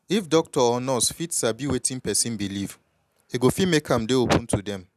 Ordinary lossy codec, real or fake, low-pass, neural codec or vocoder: none; real; 14.4 kHz; none